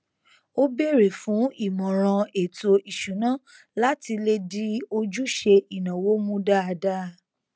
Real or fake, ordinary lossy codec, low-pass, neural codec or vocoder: real; none; none; none